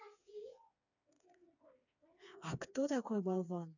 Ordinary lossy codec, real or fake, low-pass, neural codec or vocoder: none; fake; 7.2 kHz; codec, 16 kHz, 4 kbps, FreqCodec, smaller model